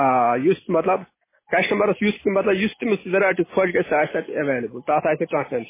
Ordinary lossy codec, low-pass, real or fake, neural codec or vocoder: MP3, 16 kbps; 3.6 kHz; real; none